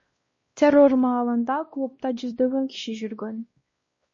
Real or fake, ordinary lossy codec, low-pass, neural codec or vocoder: fake; MP3, 32 kbps; 7.2 kHz; codec, 16 kHz, 1 kbps, X-Codec, WavLM features, trained on Multilingual LibriSpeech